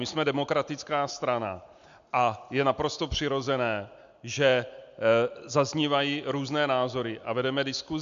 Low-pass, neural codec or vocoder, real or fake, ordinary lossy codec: 7.2 kHz; none; real; MP3, 64 kbps